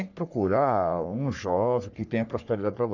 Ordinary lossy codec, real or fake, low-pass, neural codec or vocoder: none; fake; 7.2 kHz; codec, 44.1 kHz, 3.4 kbps, Pupu-Codec